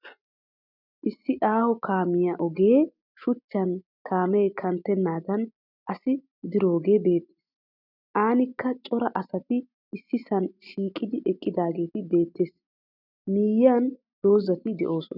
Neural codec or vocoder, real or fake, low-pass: none; real; 5.4 kHz